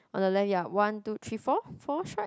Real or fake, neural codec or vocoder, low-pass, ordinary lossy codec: real; none; none; none